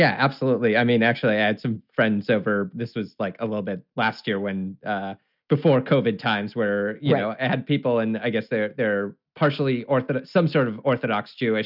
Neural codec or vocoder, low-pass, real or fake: none; 5.4 kHz; real